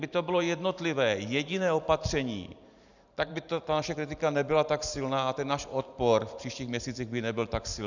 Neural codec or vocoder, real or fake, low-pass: vocoder, 44.1 kHz, 128 mel bands every 512 samples, BigVGAN v2; fake; 7.2 kHz